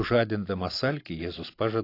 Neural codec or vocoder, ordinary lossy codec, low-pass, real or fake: none; AAC, 32 kbps; 5.4 kHz; real